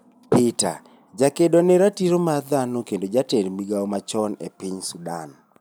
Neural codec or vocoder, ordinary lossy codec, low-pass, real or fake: none; none; none; real